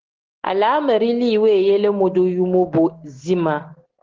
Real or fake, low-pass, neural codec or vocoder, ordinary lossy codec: real; 7.2 kHz; none; Opus, 16 kbps